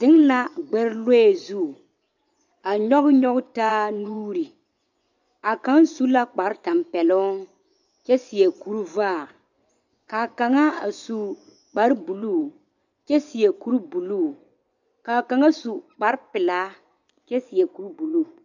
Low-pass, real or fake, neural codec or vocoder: 7.2 kHz; fake; vocoder, 24 kHz, 100 mel bands, Vocos